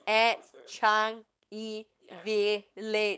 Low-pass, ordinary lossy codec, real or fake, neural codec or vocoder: none; none; fake; codec, 16 kHz, 4.8 kbps, FACodec